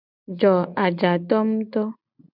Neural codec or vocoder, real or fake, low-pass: none; real; 5.4 kHz